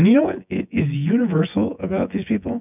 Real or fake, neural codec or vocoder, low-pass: fake; vocoder, 24 kHz, 100 mel bands, Vocos; 3.6 kHz